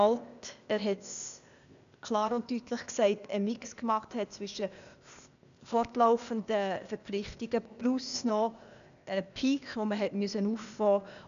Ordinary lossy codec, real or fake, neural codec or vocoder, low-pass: none; fake; codec, 16 kHz, 0.8 kbps, ZipCodec; 7.2 kHz